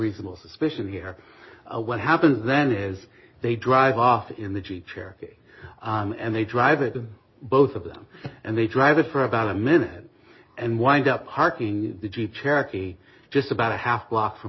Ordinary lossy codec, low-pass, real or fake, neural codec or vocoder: MP3, 24 kbps; 7.2 kHz; real; none